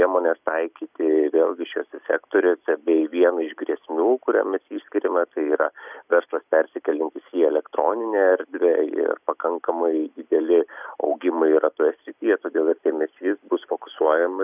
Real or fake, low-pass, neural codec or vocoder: real; 3.6 kHz; none